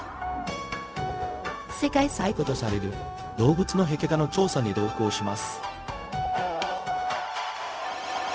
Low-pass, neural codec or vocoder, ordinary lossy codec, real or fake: none; codec, 16 kHz, 0.4 kbps, LongCat-Audio-Codec; none; fake